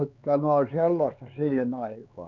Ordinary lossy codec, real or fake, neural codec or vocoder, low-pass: none; fake; codec, 16 kHz, 4 kbps, X-Codec, HuBERT features, trained on LibriSpeech; 7.2 kHz